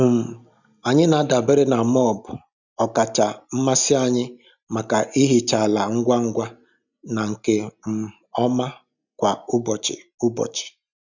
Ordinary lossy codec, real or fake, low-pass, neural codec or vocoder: none; real; 7.2 kHz; none